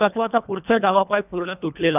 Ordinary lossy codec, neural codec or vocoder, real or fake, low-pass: none; codec, 24 kHz, 1.5 kbps, HILCodec; fake; 3.6 kHz